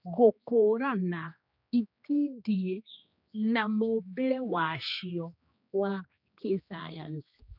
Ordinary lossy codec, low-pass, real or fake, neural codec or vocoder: none; 5.4 kHz; fake; codec, 16 kHz, 2 kbps, X-Codec, HuBERT features, trained on general audio